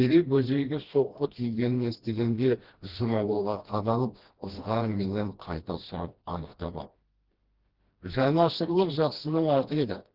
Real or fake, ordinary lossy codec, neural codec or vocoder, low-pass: fake; Opus, 16 kbps; codec, 16 kHz, 1 kbps, FreqCodec, smaller model; 5.4 kHz